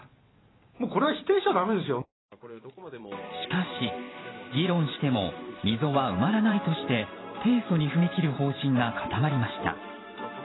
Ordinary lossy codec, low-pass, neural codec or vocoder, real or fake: AAC, 16 kbps; 7.2 kHz; vocoder, 44.1 kHz, 128 mel bands every 512 samples, BigVGAN v2; fake